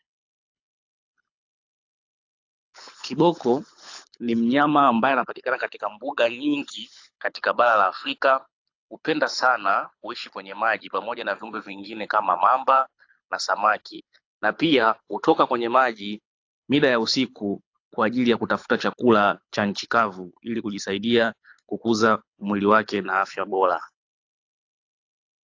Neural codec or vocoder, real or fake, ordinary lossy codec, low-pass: codec, 24 kHz, 6 kbps, HILCodec; fake; AAC, 48 kbps; 7.2 kHz